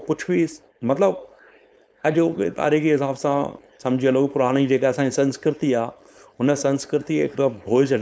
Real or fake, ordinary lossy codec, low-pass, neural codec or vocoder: fake; none; none; codec, 16 kHz, 4.8 kbps, FACodec